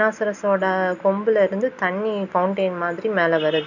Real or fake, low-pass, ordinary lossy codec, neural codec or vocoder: real; 7.2 kHz; none; none